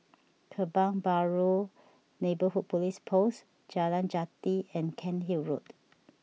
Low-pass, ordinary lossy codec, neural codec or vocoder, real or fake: none; none; none; real